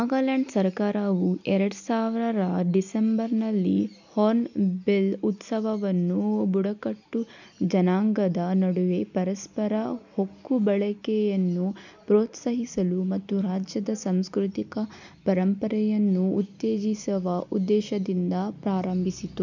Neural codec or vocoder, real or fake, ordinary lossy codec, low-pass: none; real; none; 7.2 kHz